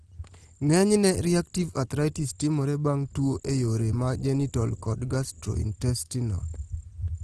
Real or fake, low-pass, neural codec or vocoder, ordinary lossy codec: real; 10.8 kHz; none; Opus, 16 kbps